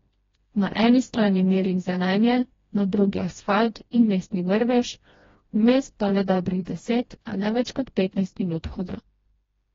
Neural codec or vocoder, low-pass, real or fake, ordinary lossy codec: codec, 16 kHz, 1 kbps, FreqCodec, smaller model; 7.2 kHz; fake; AAC, 24 kbps